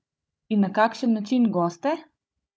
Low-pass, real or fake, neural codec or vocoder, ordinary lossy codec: none; fake; codec, 16 kHz, 16 kbps, FunCodec, trained on Chinese and English, 50 frames a second; none